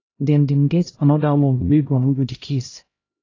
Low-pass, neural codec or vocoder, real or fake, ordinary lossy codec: 7.2 kHz; codec, 16 kHz, 0.5 kbps, X-Codec, HuBERT features, trained on LibriSpeech; fake; AAC, 32 kbps